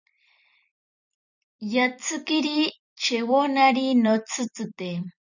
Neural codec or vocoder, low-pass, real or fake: vocoder, 44.1 kHz, 128 mel bands every 256 samples, BigVGAN v2; 7.2 kHz; fake